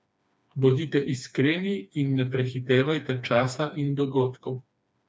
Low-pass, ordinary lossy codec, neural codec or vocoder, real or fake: none; none; codec, 16 kHz, 2 kbps, FreqCodec, smaller model; fake